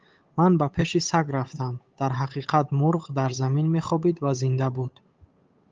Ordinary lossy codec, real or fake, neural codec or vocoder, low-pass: Opus, 24 kbps; fake; codec, 16 kHz, 8 kbps, FunCodec, trained on Chinese and English, 25 frames a second; 7.2 kHz